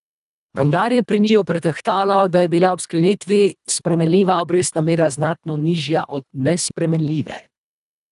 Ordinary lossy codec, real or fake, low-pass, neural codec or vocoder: none; fake; 10.8 kHz; codec, 24 kHz, 1.5 kbps, HILCodec